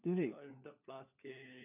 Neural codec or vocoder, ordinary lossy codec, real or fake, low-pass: codec, 16 kHz, 4 kbps, FunCodec, trained on LibriTTS, 50 frames a second; none; fake; 3.6 kHz